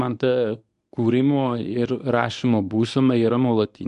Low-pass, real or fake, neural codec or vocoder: 10.8 kHz; fake; codec, 24 kHz, 0.9 kbps, WavTokenizer, medium speech release version 1